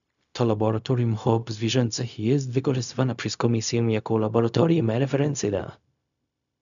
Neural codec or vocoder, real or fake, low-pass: codec, 16 kHz, 0.4 kbps, LongCat-Audio-Codec; fake; 7.2 kHz